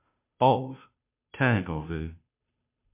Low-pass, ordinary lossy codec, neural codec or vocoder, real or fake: 3.6 kHz; MP3, 32 kbps; codec, 16 kHz, 0.5 kbps, FunCodec, trained on Chinese and English, 25 frames a second; fake